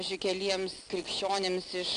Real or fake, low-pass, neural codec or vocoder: real; 9.9 kHz; none